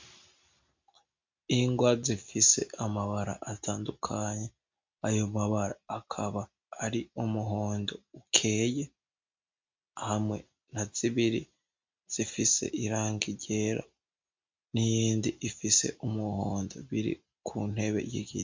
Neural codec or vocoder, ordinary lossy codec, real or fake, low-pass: none; MP3, 64 kbps; real; 7.2 kHz